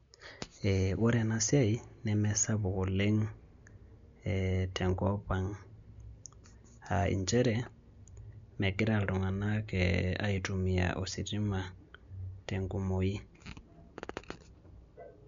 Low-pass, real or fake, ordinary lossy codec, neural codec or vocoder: 7.2 kHz; real; MP3, 64 kbps; none